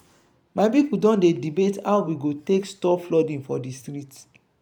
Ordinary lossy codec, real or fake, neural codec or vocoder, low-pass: none; fake; vocoder, 44.1 kHz, 128 mel bands every 512 samples, BigVGAN v2; 19.8 kHz